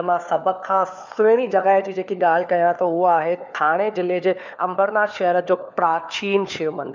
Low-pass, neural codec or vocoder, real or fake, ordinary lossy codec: 7.2 kHz; codec, 16 kHz, 4 kbps, FunCodec, trained on LibriTTS, 50 frames a second; fake; none